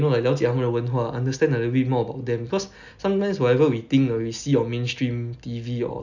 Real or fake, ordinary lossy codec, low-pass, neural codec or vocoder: real; none; 7.2 kHz; none